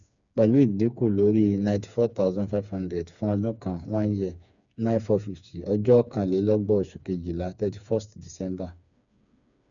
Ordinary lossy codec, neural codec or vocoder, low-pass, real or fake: none; codec, 16 kHz, 4 kbps, FreqCodec, smaller model; 7.2 kHz; fake